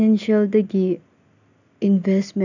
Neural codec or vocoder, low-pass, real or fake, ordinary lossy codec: none; 7.2 kHz; real; none